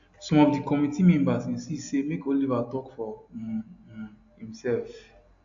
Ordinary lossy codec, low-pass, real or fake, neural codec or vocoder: AAC, 64 kbps; 7.2 kHz; real; none